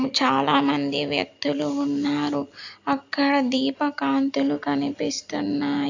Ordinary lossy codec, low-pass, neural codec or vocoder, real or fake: AAC, 48 kbps; 7.2 kHz; none; real